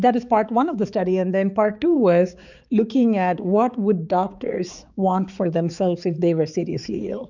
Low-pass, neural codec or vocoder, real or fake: 7.2 kHz; codec, 16 kHz, 4 kbps, X-Codec, HuBERT features, trained on balanced general audio; fake